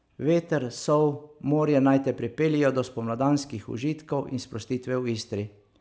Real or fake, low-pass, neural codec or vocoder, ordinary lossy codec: real; none; none; none